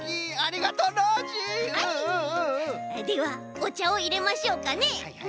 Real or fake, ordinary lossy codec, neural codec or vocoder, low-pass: real; none; none; none